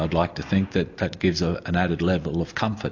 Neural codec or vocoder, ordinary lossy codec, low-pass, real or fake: none; AAC, 48 kbps; 7.2 kHz; real